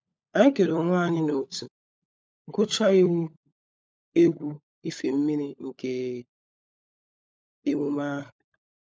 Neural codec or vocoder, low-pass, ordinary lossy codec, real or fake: codec, 16 kHz, 16 kbps, FunCodec, trained on LibriTTS, 50 frames a second; none; none; fake